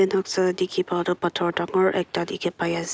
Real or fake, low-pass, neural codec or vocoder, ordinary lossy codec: real; none; none; none